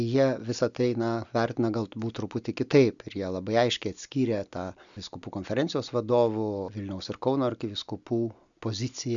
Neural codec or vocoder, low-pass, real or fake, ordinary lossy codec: none; 7.2 kHz; real; MP3, 96 kbps